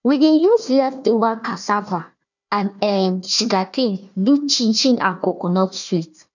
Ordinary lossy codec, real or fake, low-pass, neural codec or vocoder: none; fake; 7.2 kHz; codec, 16 kHz, 1 kbps, FunCodec, trained on Chinese and English, 50 frames a second